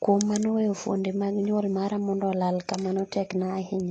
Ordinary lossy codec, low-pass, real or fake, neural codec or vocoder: AAC, 32 kbps; 9.9 kHz; real; none